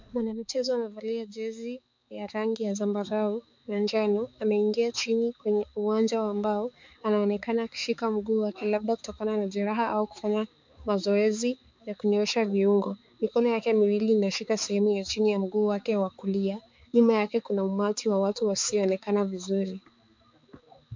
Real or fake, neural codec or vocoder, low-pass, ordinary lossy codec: fake; codec, 16 kHz, 4 kbps, X-Codec, HuBERT features, trained on balanced general audio; 7.2 kHz; MP3, 64 kbps